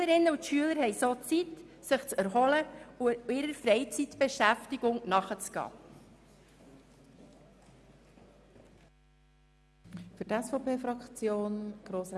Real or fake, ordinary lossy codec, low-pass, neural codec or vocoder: real; none; none; none